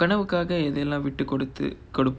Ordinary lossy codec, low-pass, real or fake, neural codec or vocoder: none; none; real; none